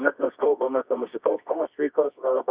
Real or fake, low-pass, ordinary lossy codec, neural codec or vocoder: fake; 3.6 kHz; Opus, 64 kbps; codec, 24 kHz, 0.9 kbps, WavTokenizer, medium music audio release